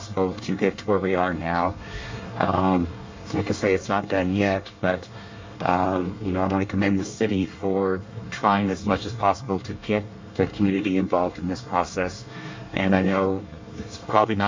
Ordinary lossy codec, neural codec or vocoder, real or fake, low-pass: MP3, 48 kbps; codec, 24 kHz, 1 kbps, SNAC; fake; 7.2 kHz